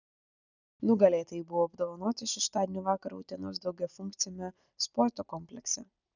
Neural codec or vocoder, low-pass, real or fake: none; 7.2 kHz; real